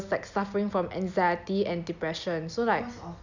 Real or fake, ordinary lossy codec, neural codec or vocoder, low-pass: real; none; none; 7.2 kHz